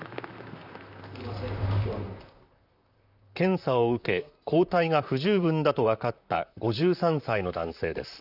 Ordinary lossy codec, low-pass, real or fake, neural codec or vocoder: none; 5.4 kHz; fake; vocoder, 44.1 kHz, 128 mel bands, Pupu-Vocoder